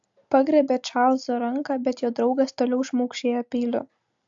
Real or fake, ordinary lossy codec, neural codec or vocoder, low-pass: real; AAC, 64 kbps; none; 7.2 kHz